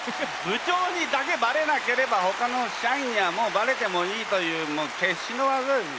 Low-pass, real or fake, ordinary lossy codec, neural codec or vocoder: none; real; none; none